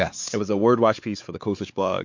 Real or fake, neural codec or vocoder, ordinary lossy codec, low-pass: fake; codec, 16 kHz, 4 kbps, X-Codec, WavLM features, trained on Multilingual LibriSpeech; MP3, 48 kbps; 7.2 kHz